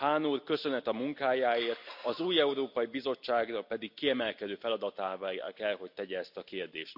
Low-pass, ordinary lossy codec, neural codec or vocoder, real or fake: 5.4 kHz; none; none; real